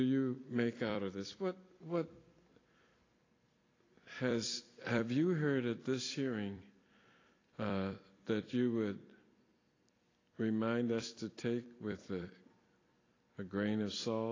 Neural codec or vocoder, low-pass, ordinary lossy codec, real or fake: vocoder, 44.1 kHz, 128 mel bands every 256 samples, BigVGAN v2; 7.2 kHz; AAC, 32 kbps; fake